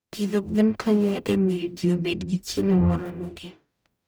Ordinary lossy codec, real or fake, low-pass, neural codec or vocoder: none; fake; none; codec, 44.1 kHz, 0.9 kbps, DAC